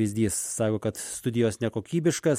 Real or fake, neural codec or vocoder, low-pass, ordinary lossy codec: real; none; 19.8 kHz; MP3, 64 kbps